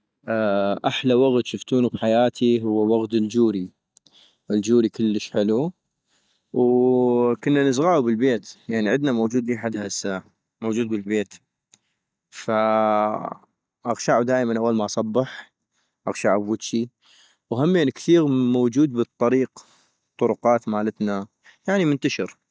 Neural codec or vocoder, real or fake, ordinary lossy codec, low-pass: none; real; none; none